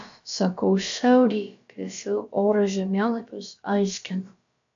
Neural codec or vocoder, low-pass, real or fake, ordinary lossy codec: codec, 16 kHz, about 1 kbps, DyCAST, with the encoder's durations; 7.2 kHz; fake; AAC, 64 kbps